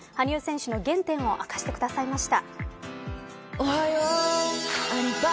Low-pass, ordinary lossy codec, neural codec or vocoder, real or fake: none; none; none; real